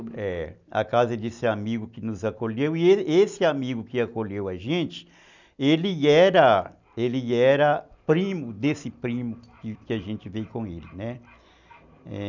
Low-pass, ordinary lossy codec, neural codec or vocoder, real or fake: 7.2 kHz; none; none; real